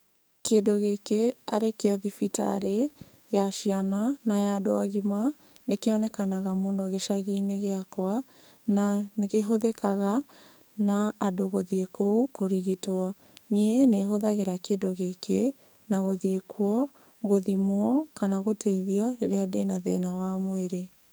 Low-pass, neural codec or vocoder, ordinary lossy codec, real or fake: none; codec, 44.1 kHz, 2.6 kbps, SNAC; none; fake